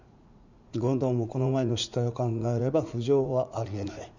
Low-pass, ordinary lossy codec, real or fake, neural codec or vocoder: 7.2 kHz; none; fake; vocoder, 44.1 kHz, 80 mel bands, Vocos